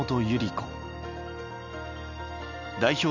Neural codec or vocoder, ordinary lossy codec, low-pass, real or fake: none; none; 7.2 kHz; real